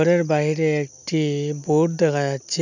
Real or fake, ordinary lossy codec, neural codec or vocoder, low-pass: real; none; none; 7.2 kHz